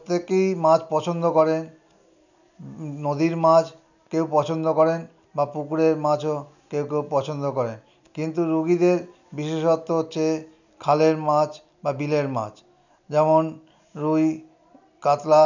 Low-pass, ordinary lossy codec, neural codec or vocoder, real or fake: 7.2 kHz; none; none; real